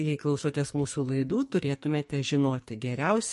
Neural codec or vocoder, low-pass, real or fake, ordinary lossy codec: codec, 44.1 kHz, 2.6 kbps, SNAC; 14.4 kHz; fake; MP3, 48 kbps